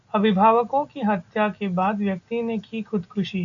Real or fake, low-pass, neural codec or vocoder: real; 7.2 kHz; none